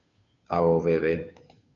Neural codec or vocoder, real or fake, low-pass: codec, 16 kHz, 2 kbps, FunCodec, trained on Chinese and English, 25 frames a second; fake; 7.2 kHz